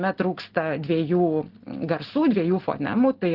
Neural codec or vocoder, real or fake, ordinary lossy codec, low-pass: none; real; Opus, 16 kbps; 5.4 kHz